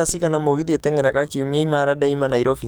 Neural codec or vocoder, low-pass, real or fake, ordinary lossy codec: codec, 44.1 kHz, 2.6 kbps, SNAC; none; fake; none